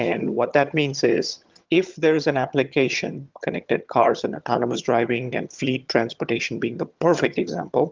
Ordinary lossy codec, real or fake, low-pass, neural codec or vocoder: Opus, 32 kbps; fake; 7.2 kHz; vocoder, 22.05 kHz, 80 mel bands, HiFi-GAN